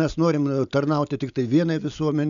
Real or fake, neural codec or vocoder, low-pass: real; none; 7.2 kHz